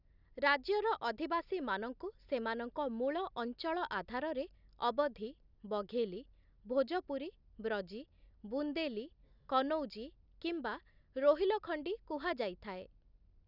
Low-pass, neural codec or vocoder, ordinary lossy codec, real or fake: 5.4 kHz; none; none; real